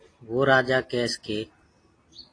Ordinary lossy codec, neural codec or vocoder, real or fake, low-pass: AAC, 32 kbps; none; real; 9.9 kHz